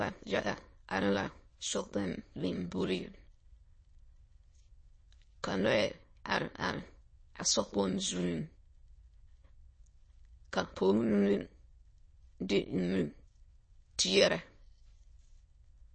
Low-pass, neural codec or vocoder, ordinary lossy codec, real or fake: 9.9 kHz; autoencoder, 22.05 kHz, a latent of 192 numbers a frame, VITS, trained on many speakers; MP3, 32 kbps; fake